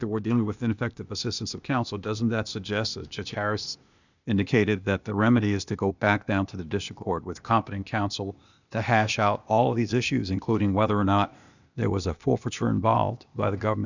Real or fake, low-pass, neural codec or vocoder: fake; 7.2 kHz; codec, 16 kHz, 0.8 kbps, ZipCodec